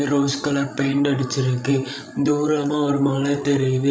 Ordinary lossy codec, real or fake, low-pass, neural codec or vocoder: none; fake; none; codec, 16 kHz, 16 kbps, FreqCodec, larger model